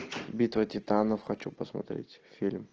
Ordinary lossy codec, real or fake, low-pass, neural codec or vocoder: Opus, 16 kbps; real; 7.2 kHz; none